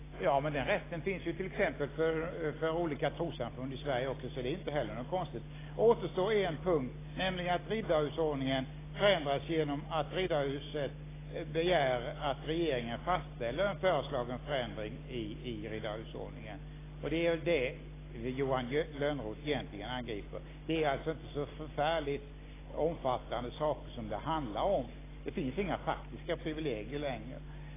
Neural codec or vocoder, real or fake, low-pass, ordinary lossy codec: none; real; 3.6 kHz; AAC, 16 kbps